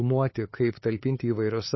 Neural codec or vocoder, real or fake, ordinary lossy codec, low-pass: codec, 16 kHz, 8 kbps, FunCodec, trained on LibriTTS, 25 frames a second; fake; MP3, 24 kbps; 7.2 kHz